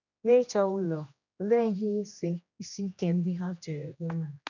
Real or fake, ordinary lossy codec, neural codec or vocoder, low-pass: fake; none; codec, 16 kHz, 1 kbps, X-Codec, HuBERT features, trained on general audio; 7.2 kHz